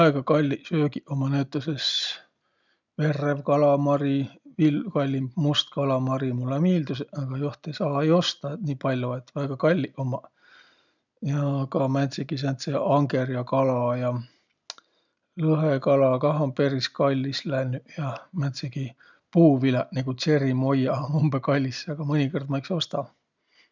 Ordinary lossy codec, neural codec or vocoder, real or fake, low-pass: none; none; real; 7.2 kHz